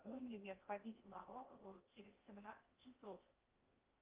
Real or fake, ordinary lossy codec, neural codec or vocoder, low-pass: fake; Opus, 24 kbps; codec, 16 kHz in and 24 kHz out, 0.6 kbps, FocalCodec, streaming, 2048 codes; 3.6 kHz